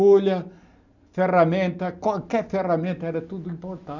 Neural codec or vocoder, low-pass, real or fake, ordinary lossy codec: none; 7.2 kHz; real; none